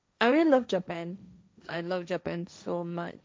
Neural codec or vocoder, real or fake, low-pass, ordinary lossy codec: codec, 16 kHz, 1.1 kbps, Voila-Tokenizer; fake; none; none